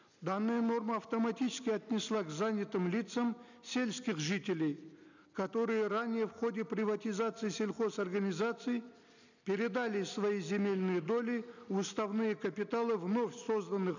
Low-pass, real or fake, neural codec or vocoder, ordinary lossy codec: 7.2 kHz; real; none; none